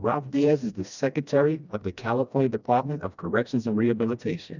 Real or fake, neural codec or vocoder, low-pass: fake; codec, 16 kHz, 1 kbps, FreqCodec, smaller model; 7.2 kHz